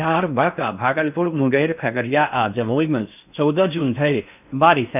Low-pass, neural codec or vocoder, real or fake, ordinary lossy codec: 3.6 kHz; codec, 16 kHz in and 24 kHz out, 0.6 kbps, FocalCodec, streaming, 4096 codes; fake; none